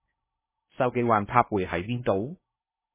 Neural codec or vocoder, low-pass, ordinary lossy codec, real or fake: codec, 16 kHz in and 24 kHz out, 0.6 kbps, FocalCodec, streaming, 4096 codes; 3.6 kHz; MP3, 16 kbps; fake